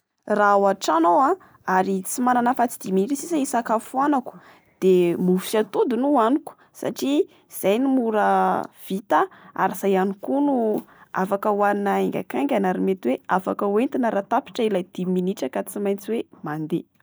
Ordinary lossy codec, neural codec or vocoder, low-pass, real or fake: none; none; none; real